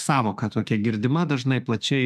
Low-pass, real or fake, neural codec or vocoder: 14.4 kHz; fake; autoencoder, 48 kHz, 32 numbers a frame, DAC-VAE, trained on Japanese speech